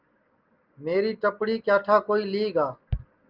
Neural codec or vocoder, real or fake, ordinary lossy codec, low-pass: none; real; Opus, 24 kbps; 5.4 kHz